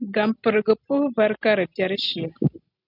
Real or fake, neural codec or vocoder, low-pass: real; none; 5.4 kHz